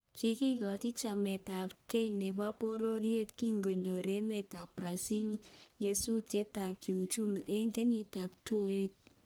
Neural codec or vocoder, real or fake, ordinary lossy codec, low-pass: codec, 44.1 kHz, 1.7 kbps, Pupu-Codec; fake; none; none